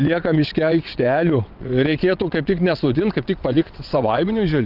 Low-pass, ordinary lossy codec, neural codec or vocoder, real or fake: 5.4 kHz; Opus, 32 kbps; vocoder, 22.05 kHz, 80 mel bands, WaveNeXt; fake